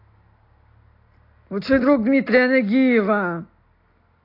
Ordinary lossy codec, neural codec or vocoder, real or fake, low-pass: AAC, 32 kbps; none; real; 5.4 kHz